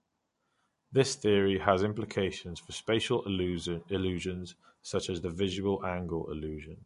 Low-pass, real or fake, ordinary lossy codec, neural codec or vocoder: 10.8 kHz; real; MP3, 48 kbps; none